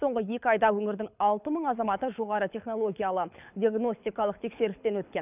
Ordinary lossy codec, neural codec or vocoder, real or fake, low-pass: none; codec, 24 kHz, 6 kbps, HILCodec; fake; 3.6 kHz